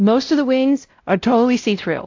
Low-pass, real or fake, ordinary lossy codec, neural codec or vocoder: 7.2 kHz; fake; AAC, 48 kbps; codec, 16 kHz, 0.5 kbps, X-Codec, WavLM features, trained on Multilingual LibriSpeech